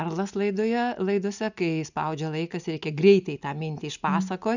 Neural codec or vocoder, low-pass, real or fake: none; 7.2 kHz; real